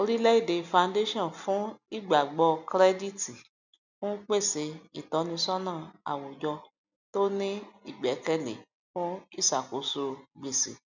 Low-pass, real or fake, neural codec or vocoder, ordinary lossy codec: 7.2 kHz; real; none; none